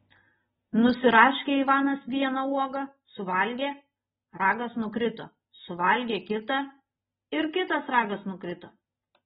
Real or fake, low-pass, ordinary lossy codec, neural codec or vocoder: real; 19.8 kHz; AAC, 16 kbps; none